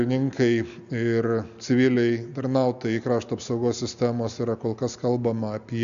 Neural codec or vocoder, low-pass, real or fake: none; 7.2 kHz; real